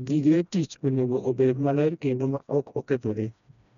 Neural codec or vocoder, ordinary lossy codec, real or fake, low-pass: codec, 16 kHz, 1 kbps, FreqCodec, smaller model; none; fake; 7.2 kHz